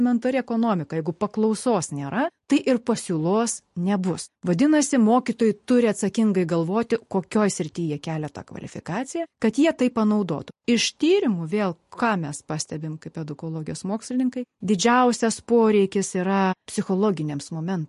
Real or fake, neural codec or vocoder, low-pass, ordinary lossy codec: real; none; 14.4 kHz; MP3, 48 kbps